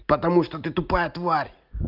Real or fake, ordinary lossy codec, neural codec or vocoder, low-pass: real; Opus, 24 kbps; none; 5.4 kHz